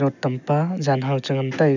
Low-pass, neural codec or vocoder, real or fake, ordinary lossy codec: 7.2 kHz; none; real; none